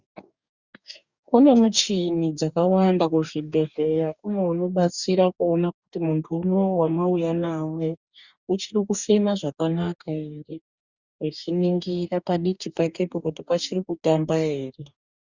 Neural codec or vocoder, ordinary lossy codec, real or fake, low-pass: codec, 44.1 kHz, 2.6 kbps, DAC; Opus, 64 kbps; fake; 7.2 kHz